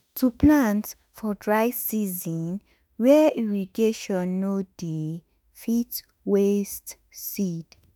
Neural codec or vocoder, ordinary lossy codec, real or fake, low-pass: autoencoder, 48 kHz, 32 numbers a frame, DAC-VAE, trained on Japanese speech; none; fake; none